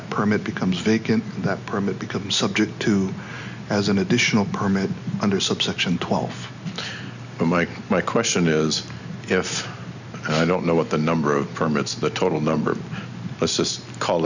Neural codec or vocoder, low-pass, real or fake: none; 7.2 kHz; real